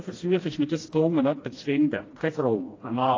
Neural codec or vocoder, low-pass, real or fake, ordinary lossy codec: codec, 16 kHz, 1 kbps, FreqCodec, smaller model; 7.2 kHz; fake; AAC, 32 kbps